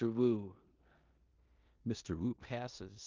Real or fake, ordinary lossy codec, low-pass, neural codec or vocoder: fake; Opus, 24 kbps; 7.2 kHz; codec, 16 kHz in and 24 kHz out, 0.9 kbps, LongCat-Audio-Codec, four codebook decoder